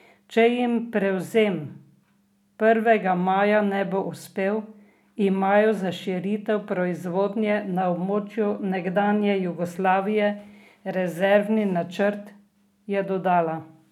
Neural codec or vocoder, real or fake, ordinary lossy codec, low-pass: none; real; none; 19.8 kHz